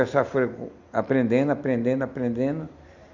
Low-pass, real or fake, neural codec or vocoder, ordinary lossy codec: 7.2 kHz; real; none; Opus, 64 kbps